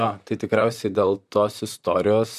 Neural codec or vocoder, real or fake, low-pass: vocoder, 44.1 kHz, 128 mel bands, Pupu-Vocoder; fake; 14.4 kHz